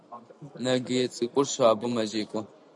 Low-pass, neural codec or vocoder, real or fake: 10.8 kHz; none; real